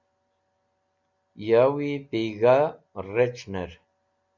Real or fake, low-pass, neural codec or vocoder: real; 7.2 kHz; none